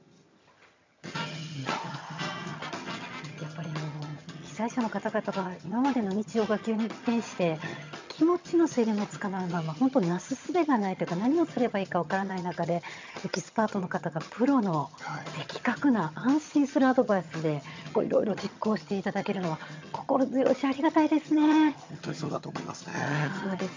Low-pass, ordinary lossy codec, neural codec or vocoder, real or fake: 7.2 kHz; MP3, 64 kbps; vocoder, 22.05 kHz, 80 mel bands, HiFi-GAN; fake